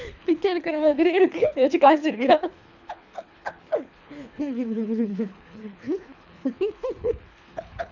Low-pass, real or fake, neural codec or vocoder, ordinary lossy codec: 7.2 kHz; fake; codec, 24 kHz, 3 kbps, HILCodec; none